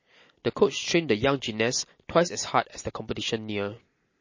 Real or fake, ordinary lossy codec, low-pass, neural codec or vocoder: real; MP3, 32 kbps; 7.2 kHz; none